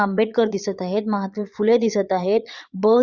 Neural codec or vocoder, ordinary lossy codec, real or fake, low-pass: vocoder, 22.05 kHz, 80 mel bands, Vocos; Opus, 64 kbps; fake; 7.2 kHz